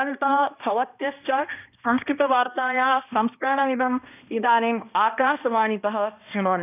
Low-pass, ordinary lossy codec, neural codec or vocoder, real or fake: 3.6 kHz; none; codec, 16 kHz, 1 kbps, X-Codec, HuBERT features, trained on balanced general audio; fake